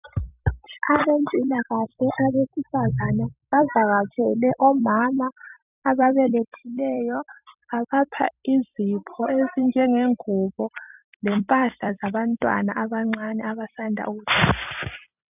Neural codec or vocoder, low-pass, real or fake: vocoder, 44.1 kHz, 128 mel bands every 256 samples, BigVGAN v2; 3.6 kHz; fake